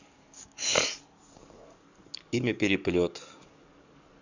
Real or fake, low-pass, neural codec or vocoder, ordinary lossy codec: fake; 7.2 kHz; codec, 44.1 kHz, 7.8 kbps, DAC; Opus, 64 kbps